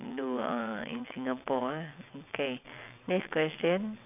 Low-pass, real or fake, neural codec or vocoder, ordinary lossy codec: 3.6 kHz; fake; vocoder, 22.05 kHz, 80 mel bands, WaveNeXt; none